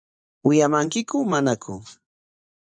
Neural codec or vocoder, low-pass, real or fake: vocoder, 24 kHz, 100 mel bands, Vocos; 9.9 kHz; fake